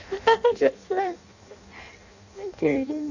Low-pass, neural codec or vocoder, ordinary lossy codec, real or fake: 7.2 kHz; codec, 16 kHz in and 24 kHz out, 0.6 kbps, FireRedTTS-2 codec; none; fake